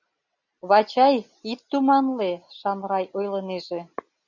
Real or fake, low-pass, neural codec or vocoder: real; 7.2 kHz; none